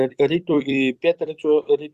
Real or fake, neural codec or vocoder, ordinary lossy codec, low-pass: fake; vocoder, 44.1 kHz, 128 mel bands every 256 samples, BigVGAN v2; Opus, 64 kbps; 14.4 kHz